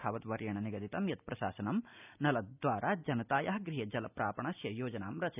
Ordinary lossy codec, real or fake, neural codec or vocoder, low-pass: none; real; none; 3.6 kHz